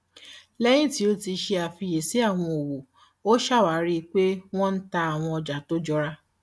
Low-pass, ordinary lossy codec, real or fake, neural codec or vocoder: none; none; real; none